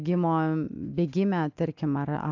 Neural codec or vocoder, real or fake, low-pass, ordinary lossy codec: none; real; 7.2 kHz; AAC, 48 kbps